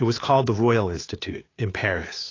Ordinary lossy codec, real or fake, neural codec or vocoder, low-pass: AAC, 32 kbps; fake; codec, 16 kHz, 2 kbps, FunCodec, trained on Chinese and English, 25 frames a second; 7.2 kHz